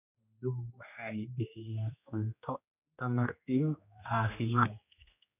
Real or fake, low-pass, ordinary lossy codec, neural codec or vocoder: fake; 3.6 kHz; none; codec, 16 kHz, 2 kbps, X-Codec, HuBERT features, trained on general audio